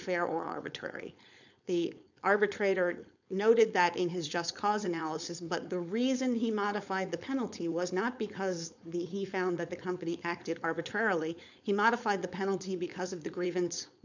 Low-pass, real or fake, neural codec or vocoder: 7.2 kHz; fake; codec, 16 kHz, 4.8 kbps, FACodec